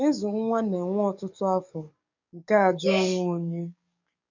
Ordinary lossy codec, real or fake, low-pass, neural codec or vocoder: none; fake; 7.2 kHz; codec, 44.1 kHz, 7.8 kbps, DAC